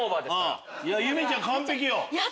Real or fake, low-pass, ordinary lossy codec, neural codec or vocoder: real; none; none; none